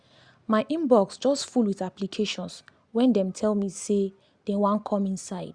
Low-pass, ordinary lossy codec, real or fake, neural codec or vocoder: 9.9 kHz; Opus, 64 kbps; real; none